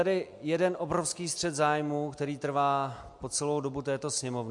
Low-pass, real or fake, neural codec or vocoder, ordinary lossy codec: 10.8 kHz; real; none; MP3, 64 kbps